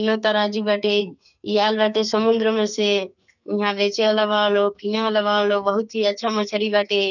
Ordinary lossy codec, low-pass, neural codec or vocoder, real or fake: none; 7.2 kHz; codec, 44.1 kHz, 2.6 kbps, SNAC; fake